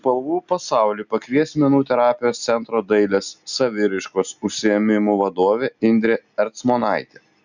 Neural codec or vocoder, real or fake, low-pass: none; real; 7.2 kHz